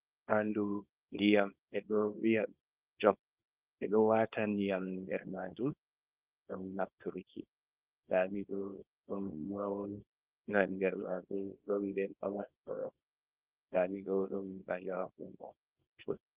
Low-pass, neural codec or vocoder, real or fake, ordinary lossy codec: 3.6 kHz; codec, 24 kHz, 0.9 kbps, WavTokenizer, small release; fake; Opus, 32 kbps